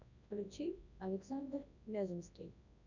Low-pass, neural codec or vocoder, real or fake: 7.2 kHz; codec, 24 kHz, 0.9 kbps, WavTokenizer, large speech release; fake